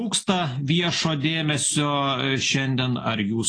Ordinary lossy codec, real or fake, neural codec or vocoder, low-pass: AAC, 32 kbps; real; none; 9.9 kHz